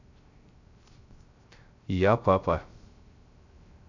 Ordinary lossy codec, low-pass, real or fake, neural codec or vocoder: AAC, 48 kbps; 7.2 kHz; fake; codec, 16 kHz, 0.3 kbps, FocalCodec